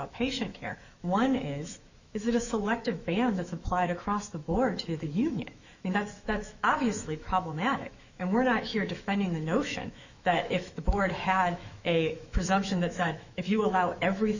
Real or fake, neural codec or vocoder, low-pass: fake; autoencoder, 48 kHz, 128 numbers a frame, DAC-VAE, trained on Japanese speech; 7.2 kHz